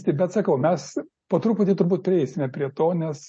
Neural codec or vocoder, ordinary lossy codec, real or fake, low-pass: none; MP3, 32 kbps; real; 9.9 kHz